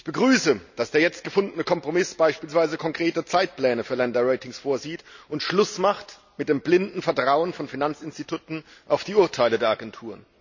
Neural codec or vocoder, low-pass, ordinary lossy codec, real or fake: none; 7.2 kHz; none; real